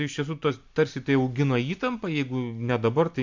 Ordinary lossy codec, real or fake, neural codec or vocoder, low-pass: MP3, 64 kbps; real; none; 7.2 kHz